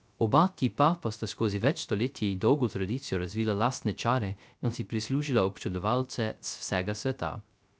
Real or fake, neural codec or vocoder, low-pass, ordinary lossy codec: fake; codec, 16 kHz, 0.3 kbps, FocalCodec; none; none